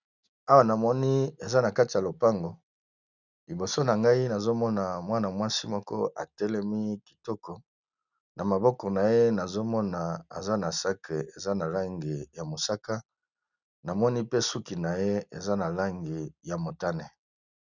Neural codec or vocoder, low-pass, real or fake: none; 7.2 kHz; real